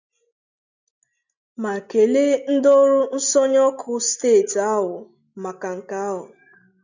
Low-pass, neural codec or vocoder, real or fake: 7.2 kHz; none; real